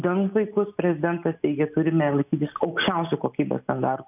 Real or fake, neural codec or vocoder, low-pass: real; none; 3.6 kHz